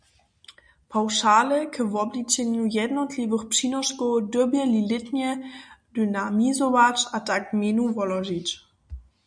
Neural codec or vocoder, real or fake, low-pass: none; real; 9.9 kHz